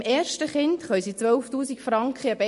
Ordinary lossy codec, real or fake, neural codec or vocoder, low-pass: MP3, 48 kbps; real; none; 14.4 kHz